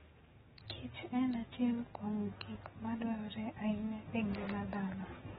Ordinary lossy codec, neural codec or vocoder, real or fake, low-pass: AAC, 16 kbps; codec, 44.1 kHz, 7.8 kbps, DAC; fake; 19.8 kHz